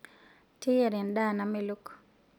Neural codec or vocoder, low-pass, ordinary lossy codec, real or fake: none; 19.8 kHz; none; real